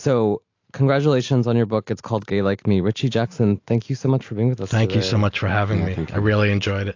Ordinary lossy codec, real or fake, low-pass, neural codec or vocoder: AAC, 48 kbps; real; 7.2 kHz; none